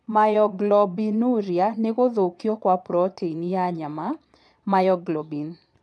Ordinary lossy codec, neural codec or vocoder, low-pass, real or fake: none; vocoder, 22.05 kHz, 80 mel bands, Vocos; none; fake